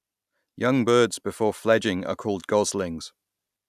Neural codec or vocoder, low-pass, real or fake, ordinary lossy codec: none; 14.4 kHz; real; none